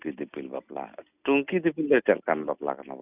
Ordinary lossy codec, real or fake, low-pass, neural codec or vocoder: none; real; 3.6 kHz; none